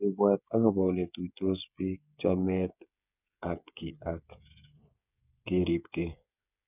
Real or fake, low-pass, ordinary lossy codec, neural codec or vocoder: fake; 3.6 kHz; none; codec, 16 kHz, 8 kbps, FreqCodec, smaller model